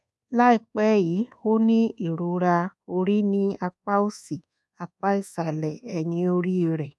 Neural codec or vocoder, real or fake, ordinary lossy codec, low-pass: codec, 24 kHz, 1.2 kbps, DualCodec; fake; none; none